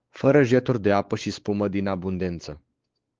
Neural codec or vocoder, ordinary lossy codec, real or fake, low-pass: codec, 16 kHz, 4 kbps, X-Codec, WavLM features, trained on Multilingual LibriSpeech; Opus, 16 kbps; fake; 7.2 kHz